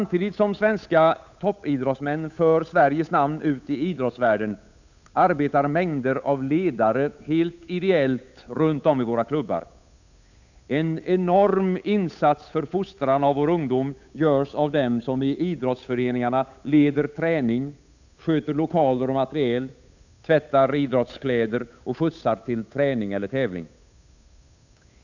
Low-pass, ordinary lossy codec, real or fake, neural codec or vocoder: 7.2 kHz; none; fake; codec, 16 kHz, 8 kbps, FunCodec, trained on Chinese and English, 25 frames a second